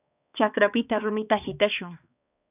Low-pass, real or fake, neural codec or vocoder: 3.6 kHz; fake; codec, 16 kHz, 2 kbps, X-Codec, HuBERT features, trained on balanced general audio